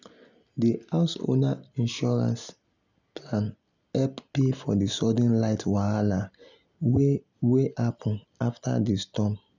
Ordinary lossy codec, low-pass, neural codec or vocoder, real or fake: none; 7.2 kHz; none; real